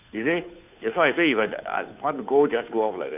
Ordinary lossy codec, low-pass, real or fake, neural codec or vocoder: none; 3.6 kHz; fake; codec, 16 kHz, 2 kbps, FunCodec, trained on Chinese and English, 25 frames a second